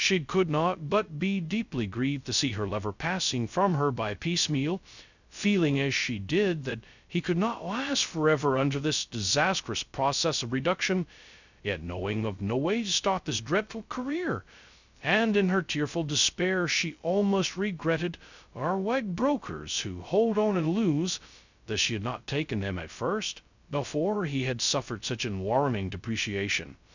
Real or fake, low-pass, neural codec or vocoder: fake; 7.2 kHz; codec, 16 kHz, 0.2 kbps, FocalCodec